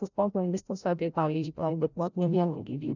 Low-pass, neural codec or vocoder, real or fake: 7.2 kHz; codec, 16 kHz, 0.5 kbps, FreqCodec, larger model; fake